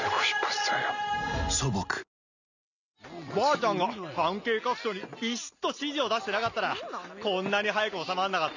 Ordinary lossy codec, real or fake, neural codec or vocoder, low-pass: none; real; none; 7.2 kHz